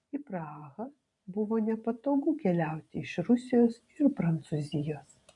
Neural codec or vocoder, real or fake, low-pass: vocoder, 44.1 kHz, 128 mel bands every 512 samples, BigVGAN v2; fake; 10.8 kHz